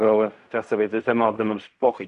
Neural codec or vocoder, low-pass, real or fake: codec, 16 kHz in and 24 kHz out, 0.4 kbps, LongCat-Audio-Codec, fine tuned four codebook decoder; 10.8 kHz; fake